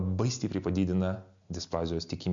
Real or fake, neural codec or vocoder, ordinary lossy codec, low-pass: real; none; MP3, 64 kbps; 7.2 kHz